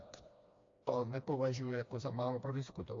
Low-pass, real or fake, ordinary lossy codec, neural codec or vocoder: 7.2 kHz; fake; AAC, 48 kbps; codec, 16 kHz, 2 kbps, FreqCodec, smaller model